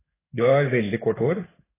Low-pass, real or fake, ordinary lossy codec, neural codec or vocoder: 3.6 kHz; fake; AAC, 16 kbps; codec, 16 kHz in and 24 kHz out, 1 kbps, XY-Tokenizer